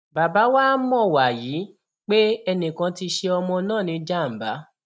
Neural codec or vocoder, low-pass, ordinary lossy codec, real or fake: none; none; none; real